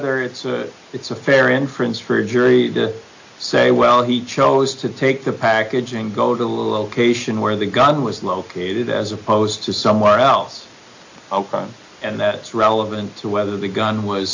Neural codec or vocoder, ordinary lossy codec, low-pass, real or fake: none; AAC, 48 kbps; 7.2 kHz; real